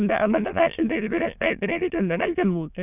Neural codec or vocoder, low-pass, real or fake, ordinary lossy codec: autoencoder, 22.05 kHz, a latent of 192 numbers a frame, VITS, trained on many speakers; 3.6 kHz; fake; none